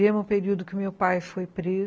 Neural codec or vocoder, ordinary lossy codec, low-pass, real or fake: none; none; none; real